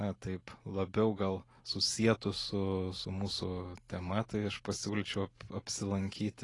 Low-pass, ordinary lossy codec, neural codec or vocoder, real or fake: 10.8 kHz; AAC, 32 kbps; none; real